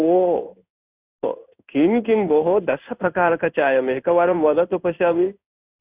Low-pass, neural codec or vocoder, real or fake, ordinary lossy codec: 3.6 kHz; codec, 16 kHz in and 24 kHz out, 1 kbps, XY-Tokenizer; fake; Opus, 64 kbps